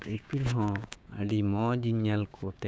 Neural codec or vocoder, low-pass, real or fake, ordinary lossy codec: codec, 16 kHz, 6 kbps, DAC; none; fake; none